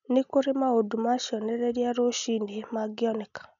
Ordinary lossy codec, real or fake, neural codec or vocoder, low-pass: none; real; none; 7.2 kHz